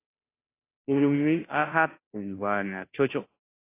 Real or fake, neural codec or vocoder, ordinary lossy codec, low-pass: fake; codec, 16 kHz, 0.5 kbps, FunCodec, trained on Chinese and English, 25 frames a second; AAC, 24 kbps; 3.6 kHz